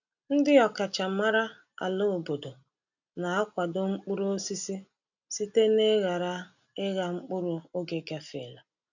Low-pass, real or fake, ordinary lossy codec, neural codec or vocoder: 7.2 kHz; real; none; none